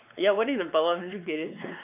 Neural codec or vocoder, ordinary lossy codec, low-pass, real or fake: codec, 16 kHz, 4 kbps, X-Codec, WavLM features, trained on Multilingual LibriSpeech; none; 3.6 kHz; fake